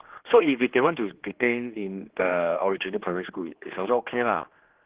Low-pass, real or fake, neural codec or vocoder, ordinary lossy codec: 3.6 kHz; fake; codec, 16 kHz, 2 kbps, X-Codec, HuBERT features, trained on general audio; Opus, 16 kbps